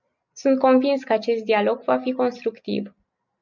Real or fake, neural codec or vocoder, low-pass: real; none; 7.2 kHz